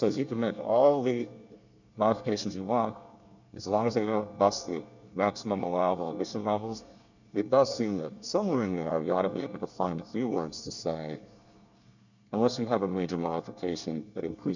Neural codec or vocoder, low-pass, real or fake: codec, 24 kHz, 1 kbps, SNAC; 7.2 kHz; fake